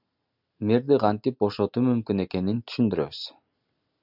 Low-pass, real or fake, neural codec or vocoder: 5.4 kHz; real; none